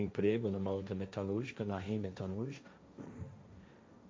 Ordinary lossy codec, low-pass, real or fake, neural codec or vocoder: none; none; fake; codec, 16 kHz, 1.1 kbps, Voila-Tokenizer